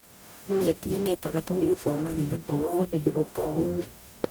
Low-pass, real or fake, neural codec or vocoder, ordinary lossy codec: none; fake; codec, 44.1 kHz, 0.9 kbps, DAC; none